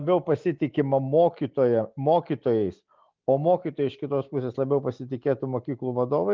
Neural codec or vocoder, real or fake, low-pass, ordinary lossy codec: none; real; 7.2 kHz; Opus, 24 kbps